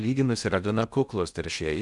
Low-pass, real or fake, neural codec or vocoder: 10.8 kHz; fake; codec, 16 kHz in and 24 kHz out, 0.6 kbps, FocalCodec, streaming, 2048 codes